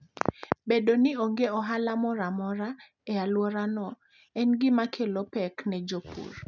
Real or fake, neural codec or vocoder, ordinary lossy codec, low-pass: real; none; none; 7.2 kHz